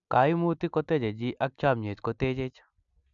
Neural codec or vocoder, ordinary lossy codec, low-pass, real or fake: none; none; 7.2 kHz; real